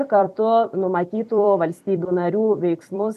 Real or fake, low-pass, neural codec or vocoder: fake; 14.4 kHz; vocoder, 44.1 kHz, 128 mel bands every 256 samples, BigVGAN v2